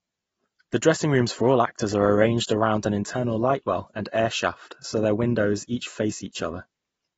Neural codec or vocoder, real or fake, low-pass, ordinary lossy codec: none; real; 19.8 kHz; AAC, 24 kbps